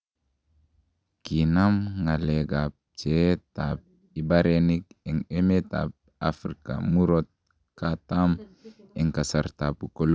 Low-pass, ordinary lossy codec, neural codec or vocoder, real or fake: none; none; none; real